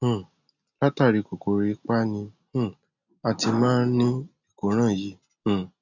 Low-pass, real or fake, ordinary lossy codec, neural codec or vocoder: 7.2 kHz; real; none; none